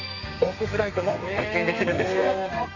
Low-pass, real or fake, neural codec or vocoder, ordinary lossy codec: 7.2 kHz; fake; codec, 32 kHz, 1.9 kbps, SNAC; none